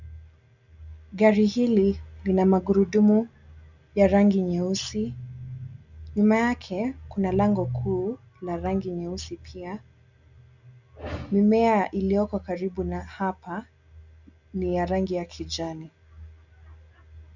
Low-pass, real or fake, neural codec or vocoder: 7.2 kHz; real; none